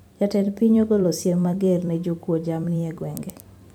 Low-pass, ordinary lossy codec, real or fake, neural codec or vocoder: 19.8 kHz; none; fake; vocoder, 44.1 kHz, 128 mel bands every 256 samples, BigVGAN v2